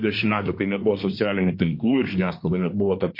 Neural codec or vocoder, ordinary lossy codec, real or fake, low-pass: codec, 24 kHz, 1 kbps, SNAC; MP3, 32 kbps; fake; 5.4 kHz